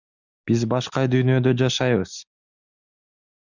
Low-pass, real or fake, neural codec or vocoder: 7.2 kHz; real; none